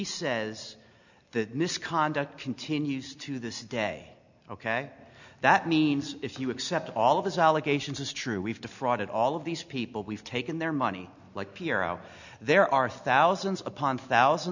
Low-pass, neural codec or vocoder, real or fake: 7.2 kHz; none; real